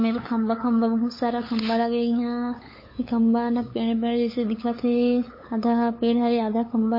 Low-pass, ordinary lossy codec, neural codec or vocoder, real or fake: 5.4 kHz; MP3, 24 kbps; codec, 16 kHz, 8 kbps, FunCodec, trained on LibriTTS, 25 frames a second; fake